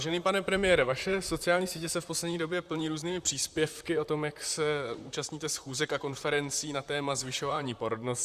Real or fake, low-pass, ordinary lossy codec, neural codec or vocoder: fake; 14.4 kHz; Opus, 64 kbps; vocoder, 44.1 kHz, 128 mel bands, Pupu-Vocoder